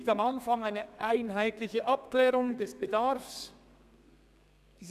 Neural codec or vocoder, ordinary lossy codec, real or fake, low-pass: codec, 32 kHz, 1.9 kbps, SNAC; none; fake; 14.4 kHz